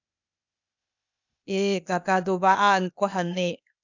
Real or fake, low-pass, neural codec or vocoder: fake; 7.2 kHz; codec, 16 kHz, 0.8 kbps, ZipCodec